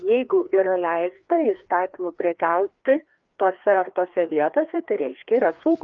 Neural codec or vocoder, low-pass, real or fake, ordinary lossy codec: codec, 16 kHz, 2 kbps, FreqCodec, larger model; 7.2 kHz; fake; Opus, 24 kbps